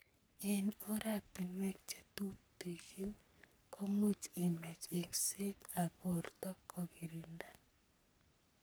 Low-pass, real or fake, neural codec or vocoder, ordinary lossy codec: none; fake; codec, 44.1 kHz, 3.4 kbps, Pupu-Codec; none